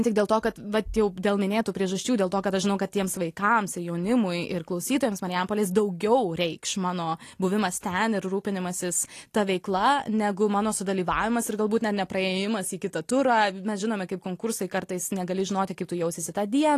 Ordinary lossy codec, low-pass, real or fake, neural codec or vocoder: AAC, 48 kbps; 14.4 kHz; real; none